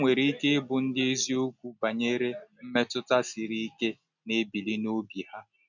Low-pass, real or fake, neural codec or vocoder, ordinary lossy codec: 7.2 kHz; real; none; none